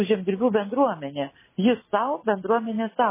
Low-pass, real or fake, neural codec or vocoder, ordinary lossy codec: 3.6 kHz; real; none; MP3, 16 kbps